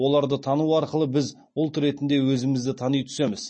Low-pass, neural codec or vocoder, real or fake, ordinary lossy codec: 9.9 kHz; none; real; MP3, 32 kbps